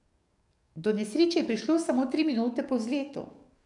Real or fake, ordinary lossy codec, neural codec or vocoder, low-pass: fake; none; codec, 44.1 kHz, 7.8 kbps, DAC; 10.8 kHz